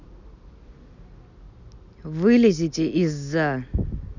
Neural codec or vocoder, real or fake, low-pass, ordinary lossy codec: none; real; 7.2 kHz; none